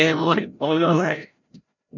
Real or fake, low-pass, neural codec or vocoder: fake; 7.2 kHz; codec, 16 kHz, 0.5 kbps, FreqCodec, larger model